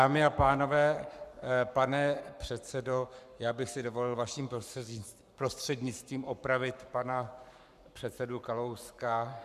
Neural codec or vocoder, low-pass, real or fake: codec, 44.1 kHz, 7.8 kbps, Pupu-Codec; 14.4 kHz; fake